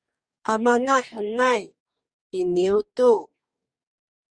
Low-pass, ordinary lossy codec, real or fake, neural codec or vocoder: 9.9 kHz; Opus, 64 kbps; fake; codec, 44.1 kHz, 2.6 kbps, DAC